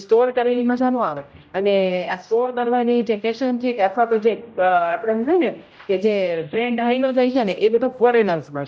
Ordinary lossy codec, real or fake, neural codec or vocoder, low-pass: none; fake; codec, 16 kHz, 0.5 kbps, X-Codec, HuBERT features, trained on general audio; none